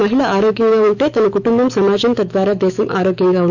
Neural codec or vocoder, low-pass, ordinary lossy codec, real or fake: autoencoder, 48 kHz, 128 numbers a frame, DAC-VAE, trained on Japanese speech; 7.2 kHz; none; fake